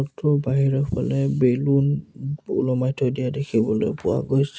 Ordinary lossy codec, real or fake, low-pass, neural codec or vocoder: none; real; none; none